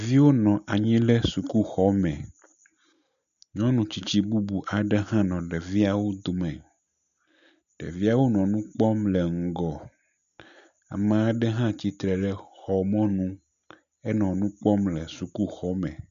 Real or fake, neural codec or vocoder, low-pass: real; none; 7.2 kHz